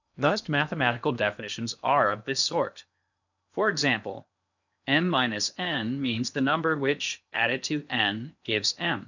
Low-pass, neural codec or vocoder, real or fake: 7.2 kHz; codec, 16 kHz in and 24 kHz out, 0.8 kbps, FocalCodec, streaming, 65536 codes; fake